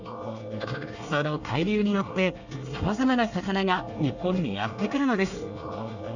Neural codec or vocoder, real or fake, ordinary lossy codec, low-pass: codec, 24 kHz, 1 kbps, SNAC; fake; none; 7.2 kHz